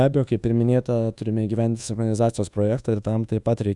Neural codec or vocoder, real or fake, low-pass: codec, 24 kHz, 1.2 kbps, DualCodec; fake; 10.8 kHz